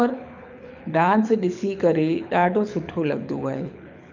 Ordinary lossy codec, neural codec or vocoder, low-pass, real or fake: none; codec, 24 kHz, 6 kbps, HILCodec; 7.2 kHz; fake